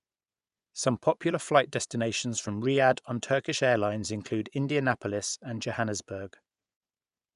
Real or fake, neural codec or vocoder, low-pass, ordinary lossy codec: fake; vocoder, 24 kHz, 100 mel bands, Vocos; 10.8 kHz; none